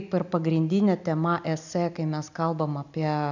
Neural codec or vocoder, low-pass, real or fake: none; 7.2 kHz; real